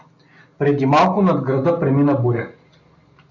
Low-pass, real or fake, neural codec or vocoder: 7.2 kHz; real; none